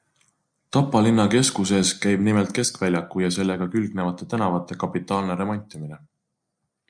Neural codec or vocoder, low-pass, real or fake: none; 9.9 kHz; real